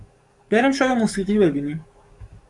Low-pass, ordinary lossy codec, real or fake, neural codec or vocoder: 10.8 kHz; Opus, 64 kbps; fake; codec, 44.1 kHz, 7.8 kbps, DAC